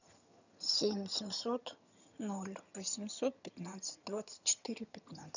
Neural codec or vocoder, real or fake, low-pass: vocoder, 22.05 kHz, 80 mel bands, HiFi-GAN; fake; 7.2 kHz